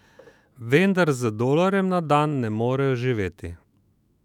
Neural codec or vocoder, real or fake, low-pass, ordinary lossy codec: autoencoder, 48 kHz, 128 numbers a frame, DAC-VAE, trained on Japanese speech; fake; 19.8 kHz; none